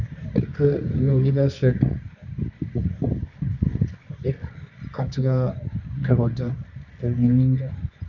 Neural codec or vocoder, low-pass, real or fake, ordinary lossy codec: codec, 24 kHz, 0.9 kbps, WavTokenizer, medium music audio release; 7.2 kHz; fake; none